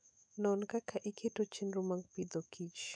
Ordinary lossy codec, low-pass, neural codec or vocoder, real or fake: none; 9.9 kHz; autoencoder, 48 kHz, 128 numbers a frame, DAC-VAE, trained on Japanese speech; fake